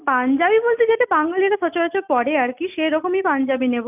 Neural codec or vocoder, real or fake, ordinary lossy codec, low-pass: none; real; none; 3.6 kHz